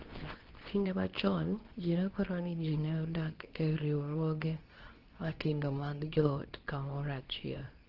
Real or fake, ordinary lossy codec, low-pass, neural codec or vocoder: fake; Opus, 16 kbps; 5.4 kHz; codec, 24 kHz, 0.9 kbps, WavTokenizer, medium speech release version 2